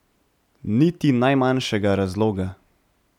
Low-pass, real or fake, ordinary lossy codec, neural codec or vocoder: 19.8 kHz; real; none; none